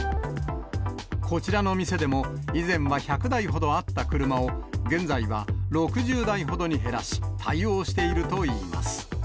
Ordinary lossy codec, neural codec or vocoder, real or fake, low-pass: none; none; real; none